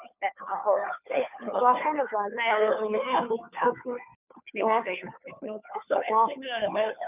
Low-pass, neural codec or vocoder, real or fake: 3.6 kHz; codec, 16 kHz, 2 kbps, FunCodec, trained on Chinese and English, 25 frames a second; fake